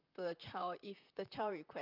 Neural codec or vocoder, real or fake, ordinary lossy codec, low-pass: vocoder, 44.1 kHz, 128 mel bands, Pupu-Vocoder; fake; none; 5.4 kHz